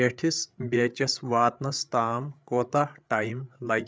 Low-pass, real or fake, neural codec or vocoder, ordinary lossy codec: none; fake; codec, 16 kHz, 8 kbps, FreqCodec, larger model; none